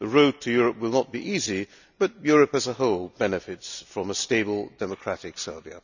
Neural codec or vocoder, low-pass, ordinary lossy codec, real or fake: none; 7.2 kHz; none; real